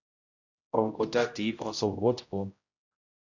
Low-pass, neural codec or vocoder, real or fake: 7.2 kHz; codec, 16 kHz, 0.5 kbps, X-Codec, HuBERT features, trained on general audio; fake